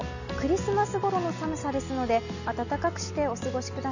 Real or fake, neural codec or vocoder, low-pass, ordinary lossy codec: real; none; 7.2 kHz; none